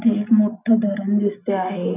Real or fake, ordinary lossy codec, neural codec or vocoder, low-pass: real; none; none; 3.6 kHz